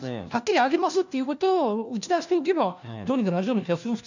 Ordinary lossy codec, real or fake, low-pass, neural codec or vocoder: none; fake; 7.2 kHz; codec, 16 kHz, 1 kbps, FunCodec, trained on LibriTTS, 50 frames a second